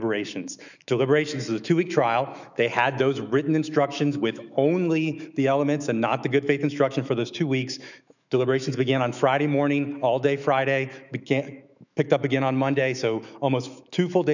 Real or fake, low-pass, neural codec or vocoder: fake; 7.2 kHz; autoencoder, 48 kHz, 128 numbers a frame, DAC-VAE, trained on Japanese speech